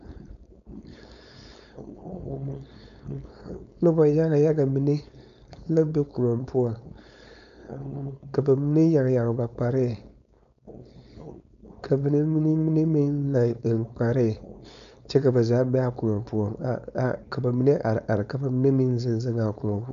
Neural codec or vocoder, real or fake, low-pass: codec, 16 kHz, 4.8 kbps, FACodec; fake; 7.2 kHz